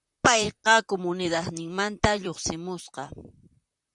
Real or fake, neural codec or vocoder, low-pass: fake; vocoder, 44.1 kHz, 128 mel bands, Pupu-Vocoder; 10.8 kHz